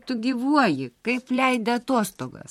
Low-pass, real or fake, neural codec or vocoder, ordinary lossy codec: 19.8 kHz; fake; vocoder, 44.1 kHz, 128 mel bands, Pupu-Vocoder; MP3, 64 kbps